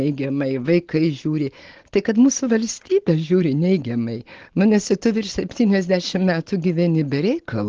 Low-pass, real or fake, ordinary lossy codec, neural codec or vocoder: 7.2 kHz; real; Opus, 16 kbps; none